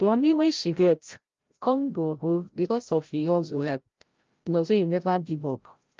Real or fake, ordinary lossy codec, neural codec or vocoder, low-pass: fake; Opus, 32 kbps; codec, 16 kHz, 0.5 kbps, FreqCodec, larger model; 7.2 kHz